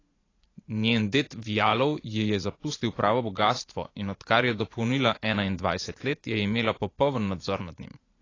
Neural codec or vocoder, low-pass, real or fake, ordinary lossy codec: vocoder, 44.1 kHz, 80 mel bands, Vocos; 7.2 kHz; fake; AAC, 32 kbps